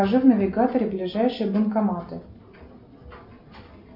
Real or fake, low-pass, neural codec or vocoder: real; 5.4 kHz; none